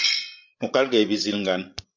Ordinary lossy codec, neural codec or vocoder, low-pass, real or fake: MP3, 64 kbps; codec, 16 kHz, 16 kbps, FreqCodec, larger model; 7.2 kHz; fake